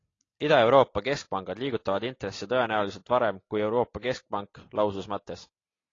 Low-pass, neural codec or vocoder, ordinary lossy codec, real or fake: 7.2 kHz; none; AAC, 32 kbps; real